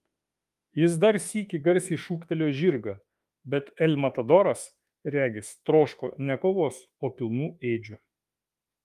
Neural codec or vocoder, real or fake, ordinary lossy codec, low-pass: autoencoder, 48 kHz, 32 numbers a frame, DAC-VAE, trained on Japanese speech; fake; Opus, 32 kbps; 14.4 kHz